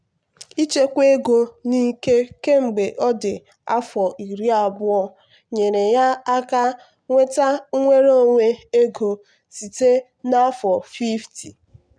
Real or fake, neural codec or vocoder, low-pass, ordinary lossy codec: real; none; 9.9 kHz; none